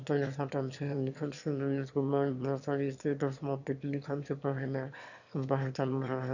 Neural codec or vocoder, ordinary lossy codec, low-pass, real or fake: autoencoder, 22.05 kHz, a latent of 192 numbers a frame, VITS, trained on one speaker; none; 7.2 kHz; fake